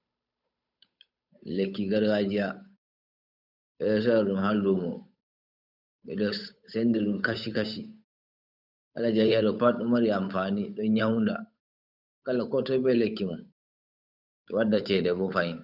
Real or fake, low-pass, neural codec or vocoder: fake; 5.4 kHz; codec, 16 kHz, 8 kbps, FunCodec, trained on Chinese and English, 25 frames a second